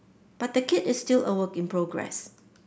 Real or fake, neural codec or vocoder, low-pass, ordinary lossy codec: real; none; none; none